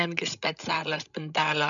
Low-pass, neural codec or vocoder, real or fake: 7.2 kHz; codec, 16 kHz, 16 kbps, FreqCodec, larger model; fake